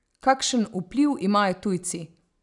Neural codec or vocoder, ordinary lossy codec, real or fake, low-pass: none; none; real; 10.8 kHz